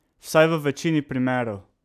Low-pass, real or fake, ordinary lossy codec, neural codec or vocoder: 14.4 kHz; real; none; none